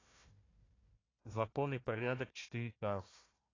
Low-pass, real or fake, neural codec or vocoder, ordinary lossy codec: 7.2 kHz; fake; codec, 16 kHz, 1 kbps, FunCodec, trained on Chinese and English, 50 frames a second; AAC, 32 kbps